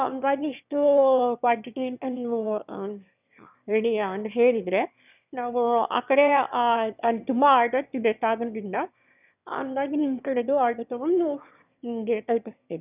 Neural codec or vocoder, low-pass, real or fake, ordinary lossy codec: autoencoder, 22.05 kHz, a latent of 192 numbers a frame, VITS, trained on one speaker; 3.6 kHz; fake; none